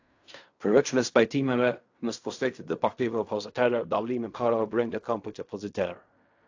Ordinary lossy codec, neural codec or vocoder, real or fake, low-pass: AAC, 48 kbps; codec, 16 kHz in and 24 kHz out, 0.4 kbps, LongCat-Audio-Codec, fine tuned four codebook decoder; fake; 7.2 kHz